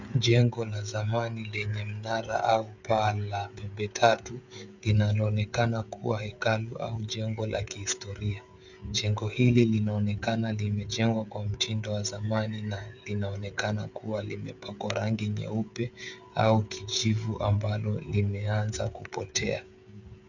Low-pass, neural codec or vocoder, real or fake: 7.2 kHz; codec, 16 kHz, 16 kbps, FreqCodec, smaller model; fake